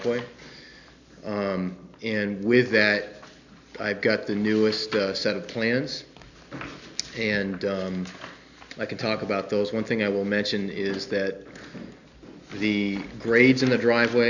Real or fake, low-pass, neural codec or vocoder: real; 7.2 kHz; none